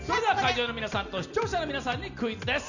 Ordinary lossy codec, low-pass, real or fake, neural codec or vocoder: none; 7.2 kHz; real; none